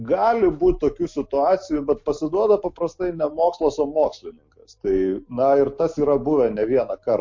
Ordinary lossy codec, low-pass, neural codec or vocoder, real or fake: MP3, 48 kbps; 7.2 kHz; none; real